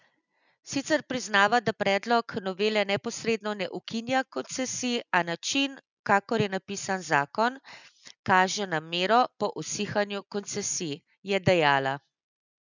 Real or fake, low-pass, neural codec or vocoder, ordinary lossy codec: real; 7.2 kHz; none; none